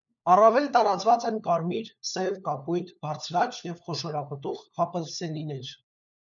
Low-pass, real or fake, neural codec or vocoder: 7.2 kHz; fake; codec, 16 kHz, 4 kbps, FunCodec, trained on LibriTTS, 50 frames a second